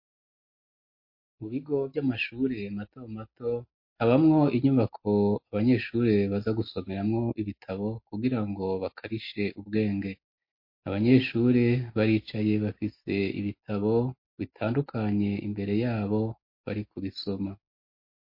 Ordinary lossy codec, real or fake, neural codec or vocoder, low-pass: MP3, 32 kbps; real; none; 5.4 kHz